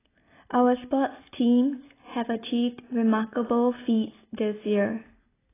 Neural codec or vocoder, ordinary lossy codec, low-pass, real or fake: none; AAC, 16 kbps; 3.6 kHz; real